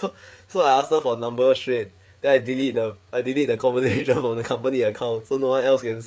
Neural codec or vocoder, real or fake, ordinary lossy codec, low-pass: codec, 16 kHz, 4 kbps, FreqCodec, larger model; fake; none; none